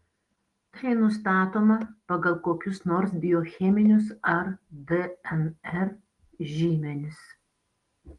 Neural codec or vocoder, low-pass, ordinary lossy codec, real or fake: none; 10.8 kHz; Opus, 24 kbps; real